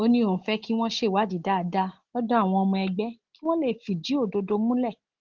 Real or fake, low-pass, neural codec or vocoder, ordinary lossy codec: real; 7.2 kHz; none; Opus, 16 kbps